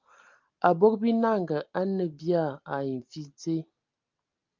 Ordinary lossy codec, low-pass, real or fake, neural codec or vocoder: Opus, 24 kbps; 7.2 kHz; real; none